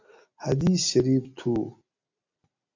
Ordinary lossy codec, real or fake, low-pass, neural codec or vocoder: MP3, 64 kbps; real; 7.2 kHz; none